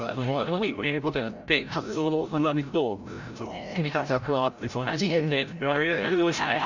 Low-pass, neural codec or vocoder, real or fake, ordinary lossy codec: 7.2 kHz; codec, 16 kHz, 0.5 kbps, FreqCodec, larger model; fake; none